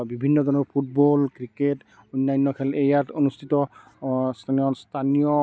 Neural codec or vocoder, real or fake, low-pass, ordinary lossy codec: none; real; none; none